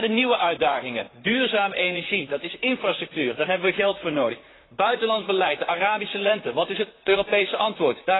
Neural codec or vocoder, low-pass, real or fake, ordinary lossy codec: vocoder, 44.1 kHz, 128 mel bands, Pupu-Vocoder; 7.2 kHz; fake; AAC, 16 kbps